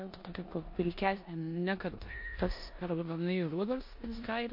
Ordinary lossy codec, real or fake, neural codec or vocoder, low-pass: MP3, 32 kbps; fake; codec, 16 kHz in and 24 kHz out, 0.9 kbps, LongCat-Audio-Codec, four codebook decoder; 5.4 kHz